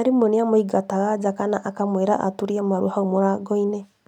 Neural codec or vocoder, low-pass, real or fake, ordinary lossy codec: none; 19.8 kHz; real; none